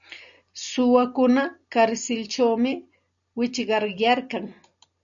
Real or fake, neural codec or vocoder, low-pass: real; none; 7.2 kHz